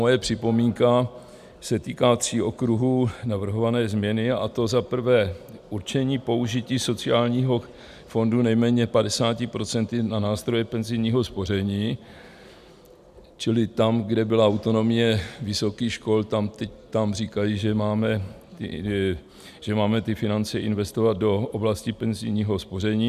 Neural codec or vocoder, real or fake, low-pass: none; real; 14.4 kHz